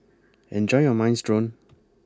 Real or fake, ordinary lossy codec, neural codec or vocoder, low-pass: real; none; none; none